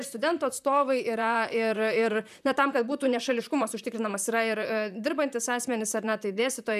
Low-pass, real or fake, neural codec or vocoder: 14.4 kHz; fake; vocoder, 44.1 kHz, 128 mel bands, Pupu-Vocoder